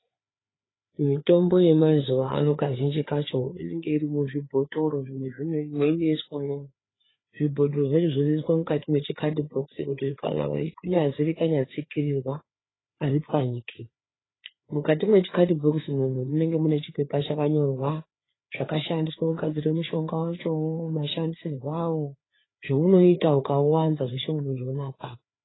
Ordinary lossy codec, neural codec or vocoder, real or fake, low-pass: AAC, 16 kbps; codec, 16 kHz, 4 kbps, FreqCodec, larger model; fake; 7.2 kHz